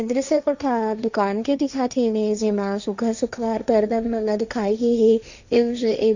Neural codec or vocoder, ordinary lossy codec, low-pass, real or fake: codec, 16 kHz, 1.1 kbps, Voila-Tokenizer; none; 7.2 kHz; fake